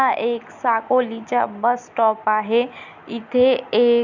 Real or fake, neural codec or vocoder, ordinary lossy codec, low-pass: real; none; none; 7.2 kHz